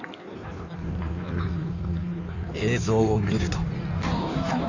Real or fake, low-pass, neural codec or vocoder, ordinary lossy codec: fake; 7.2 kHz; codec, 16 kHz in and 24 kHz out, 1.1 kbps, FireRedTTS-2 codec; none